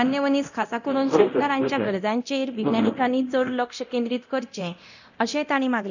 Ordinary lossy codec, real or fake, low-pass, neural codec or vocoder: none; fake; 7.2 kHz; codec, 24 kHz, 0.9 kbps, DualCodec